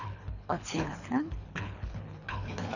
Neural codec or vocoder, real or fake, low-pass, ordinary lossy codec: codec, 24 kHz, 3 kbps, HILCodec; fake; 7.2 kHz; none